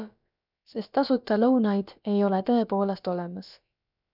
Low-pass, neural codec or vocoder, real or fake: 5.4 kHz; codec, 16 kHz, about 1 kbps, DyCAST, with the encoder's durations; fake